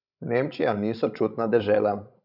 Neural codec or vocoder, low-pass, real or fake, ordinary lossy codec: codec, 16 kHz, 16 kbps, FreqCodec, larger model; 5.4 kHz; fake; none